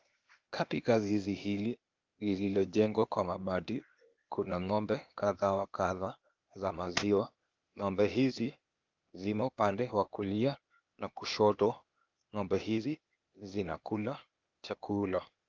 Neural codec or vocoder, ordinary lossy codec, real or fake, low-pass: codec, 16 kHz, 0.8 kbps, ZipCodec; Opus, 24 kbps; fake; 7.2 kHz